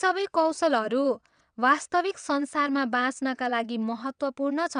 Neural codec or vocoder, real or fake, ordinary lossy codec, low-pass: vocoder, 22.05 kHz, 80 mel bands, WaveNeXt; fake; none; 9.9 kHz